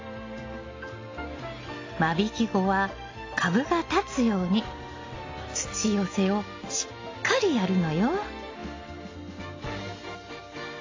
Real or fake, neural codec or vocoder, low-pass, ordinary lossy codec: real; none; 7.2 kHz; AAC, 32 kbps